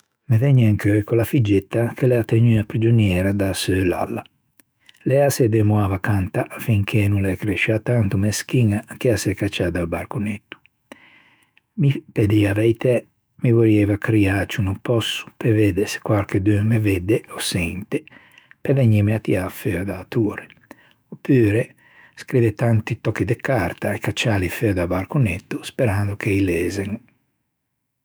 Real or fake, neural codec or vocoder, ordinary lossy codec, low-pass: fake; autoencoder, 48 kHz, 128 numbers a frame, DAC-VAE, trained on Japanese speech; none; none